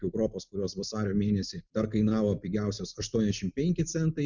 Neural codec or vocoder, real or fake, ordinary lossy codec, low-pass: none; real; Opus, 64 kbps; 7.2 kHz